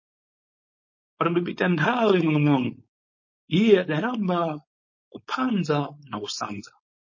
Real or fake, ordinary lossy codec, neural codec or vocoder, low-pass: fake; MP3, 32 kbps; codec, 16 kHz, 4.8 kbps, FACodec; 7.2 kHz